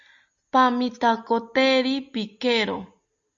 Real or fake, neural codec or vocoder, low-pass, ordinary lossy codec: real; none; 7.2 kHz; Opus, 64 kbps